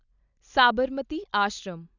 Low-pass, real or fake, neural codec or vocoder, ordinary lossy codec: 7.2 kHz; real; none; none